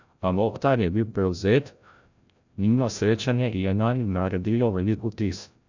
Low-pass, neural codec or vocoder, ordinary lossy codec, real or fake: 7.2 kHz; codec, 16 kHz, 0.5 kbps, FreqCodec, larger model; none; fake